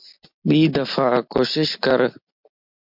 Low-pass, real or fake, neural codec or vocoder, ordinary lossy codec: 5.4 kHz; real; none; MP3, 48 kbps